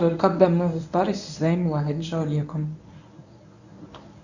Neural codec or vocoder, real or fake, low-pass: codec, 24 kHz, 0.9 kbps, WavTokenizer, medium speech release version 1; fake; 7.2 kHz